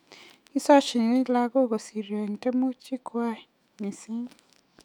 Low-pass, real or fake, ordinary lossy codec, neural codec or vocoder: 19.8 kHz; fake; none; autoencoder, 48 kHz, 128 numbers a frame, DAC-VAE, trained on Japanese speech